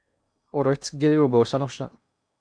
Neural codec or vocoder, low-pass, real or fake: codec, 16 kHz in and 24 kHz out, 0.8 kbps, FocalCodec, streaming, 65536 codes; 9.9 kHz; fake